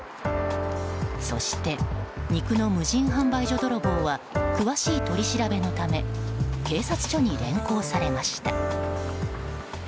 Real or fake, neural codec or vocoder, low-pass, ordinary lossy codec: real; none; none; none